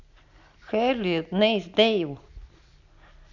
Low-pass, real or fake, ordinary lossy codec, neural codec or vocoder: 7.2 kHz; real; none; none